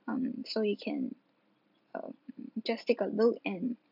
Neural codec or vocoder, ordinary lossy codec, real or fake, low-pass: none; none; real; 5.4 kHz